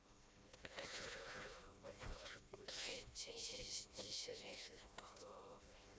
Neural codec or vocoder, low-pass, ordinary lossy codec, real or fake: codec, 16 kHz, 1 kbps, FreqCodec, smaller model; none; none; fake